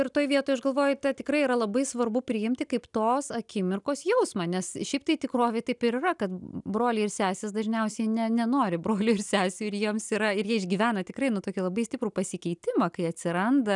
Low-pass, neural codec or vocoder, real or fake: 10.8 kHz; none; real